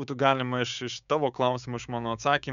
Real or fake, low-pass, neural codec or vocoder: fake; 7.2 kHz; codec, 16 kHz, 6 kbps, DAC